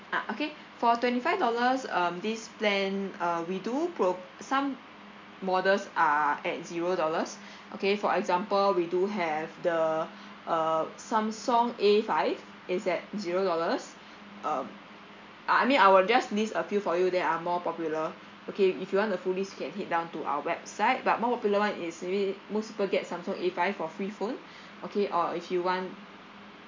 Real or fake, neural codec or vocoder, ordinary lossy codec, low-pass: real; none; MP3, 48 kbps; 7.2 kHz